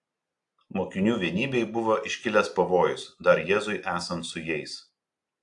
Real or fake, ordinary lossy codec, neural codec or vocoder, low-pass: real; AAC, 64 kbps; none; 10.8 kHz